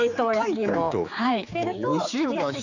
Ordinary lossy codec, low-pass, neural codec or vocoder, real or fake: none; 7.2 kHz; codec, 16 kHz, 4 kbps, X-Codec, HuBERT features, trained on general audio; fake